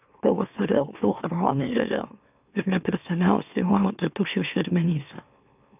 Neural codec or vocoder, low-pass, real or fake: autoencoder, 44.1 kHz, a latent of 192 numbers a frame, MeloTTS; 3.6 kHz; fake